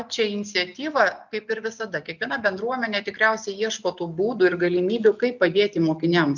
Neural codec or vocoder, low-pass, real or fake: none; 7.2 kHz; real